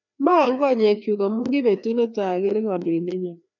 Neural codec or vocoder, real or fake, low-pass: codec, 16 kHz, 2 kbps, FreqCodec, larger model; fake; 7.2 kHz